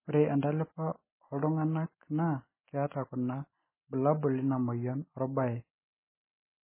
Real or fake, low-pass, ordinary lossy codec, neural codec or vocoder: real; 3.6 kHz; MP3, 16 kbps; none